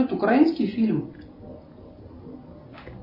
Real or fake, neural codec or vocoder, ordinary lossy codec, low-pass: real; none; MP3, 24 kbps; 5.4 kHz